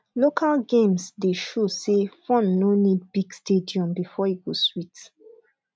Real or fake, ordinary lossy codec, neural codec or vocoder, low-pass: real; none; none; none